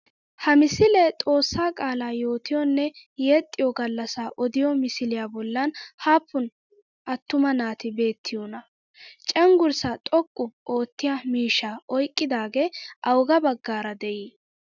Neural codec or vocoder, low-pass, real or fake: none; 7.2 kHz; real